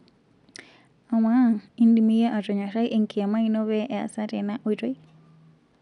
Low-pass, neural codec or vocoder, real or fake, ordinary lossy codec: 10.8 kHz; none; real; none